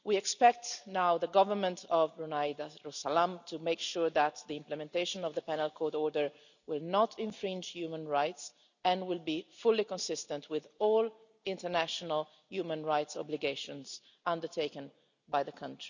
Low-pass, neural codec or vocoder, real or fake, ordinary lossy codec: 7.2 kHz; none; real; none